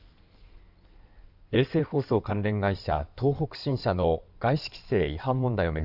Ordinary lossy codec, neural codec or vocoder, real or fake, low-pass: none; codec, 16 kHz in and 24 kHz out, 2.2 kbps, FireRedTTS-2 codec; fake; 5.4 kHz